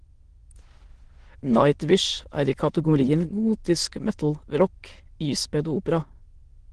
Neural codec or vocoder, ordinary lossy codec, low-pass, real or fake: autoencoder, 22.05 kHz, a latent of 192 numbers a frame, VITS, trained on many speakers; Opus, 16 kbps; 9.9 kHz; fake